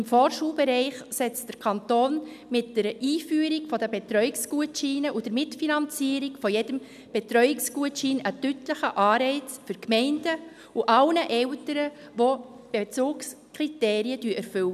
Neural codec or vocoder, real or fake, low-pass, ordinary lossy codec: none; real; 14.4 kHz; none